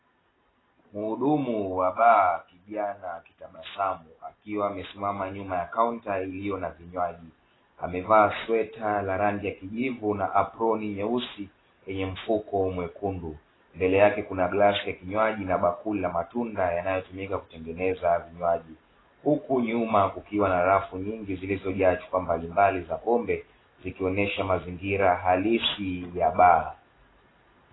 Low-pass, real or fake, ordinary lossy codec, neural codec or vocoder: 7.2 kHz; real; AAC, 16 kbps; none